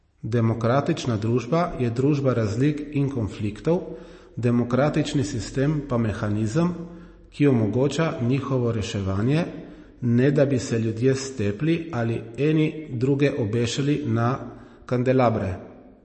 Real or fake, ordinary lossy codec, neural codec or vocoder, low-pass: real; MP3, 32 kbps; none; 10.8 kHz